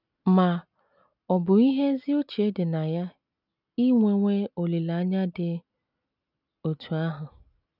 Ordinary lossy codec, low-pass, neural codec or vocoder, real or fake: none; 5.4 kHz; none; real